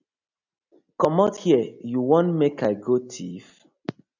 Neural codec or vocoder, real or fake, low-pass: none; real; 7.2 kHz